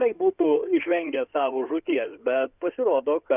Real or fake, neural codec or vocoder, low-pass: fake; codec, 16 kHz in and 24 kHz out, 2.2 kbps, FireRedTTS-2 codec; 3.6 kHz